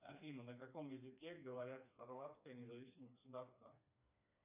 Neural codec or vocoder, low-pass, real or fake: codec, 16 kHz, 2 kbps, FreqCodec, smaller model; 3.6 kHz; fake